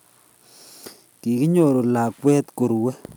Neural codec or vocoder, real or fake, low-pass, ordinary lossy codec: none; real; none; none